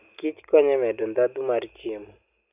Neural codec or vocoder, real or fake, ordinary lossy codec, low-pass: none; real; none; 3.6 kHz